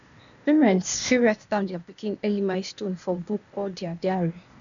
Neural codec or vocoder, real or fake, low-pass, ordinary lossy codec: codec, 16 kHz, 0.8 kbps, ZipCodec; fake; 7.2 kHz; none